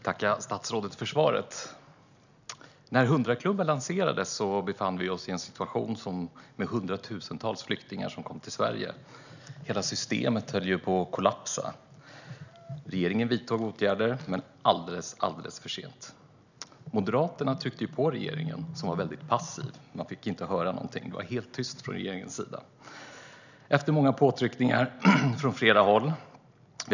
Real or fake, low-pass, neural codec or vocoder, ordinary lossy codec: real; 7.2 kHz; none; none